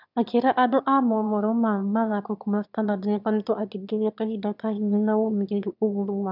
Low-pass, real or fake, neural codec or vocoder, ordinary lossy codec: 5.4 kHz; fake; autoencoder, 22.05 kHz, a latent of 192 numbers a frame, VITS, trained on one speaker; none